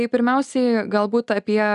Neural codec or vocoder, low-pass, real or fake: none; 10.8 kHz; real